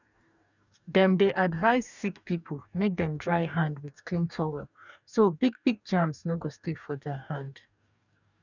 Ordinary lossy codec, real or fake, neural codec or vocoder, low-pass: none; fake; codec, 44.1 kHz, 2.6 kbps, DAC; 7.2 kHz